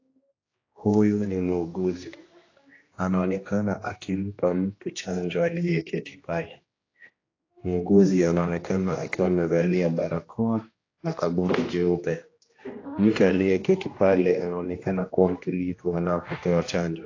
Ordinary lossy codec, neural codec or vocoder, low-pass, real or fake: AAC, 32 kbps; codec, 16 kHz, 1 kbps, X-Codec, HuBERT features, trained on general audio; 7.2 kHz; fake